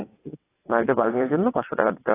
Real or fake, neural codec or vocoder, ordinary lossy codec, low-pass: fake; vocoder, 22.05 kHz, 80 mel bands, WaveNeXt; AAC, 16 kbps; 3.6 kHz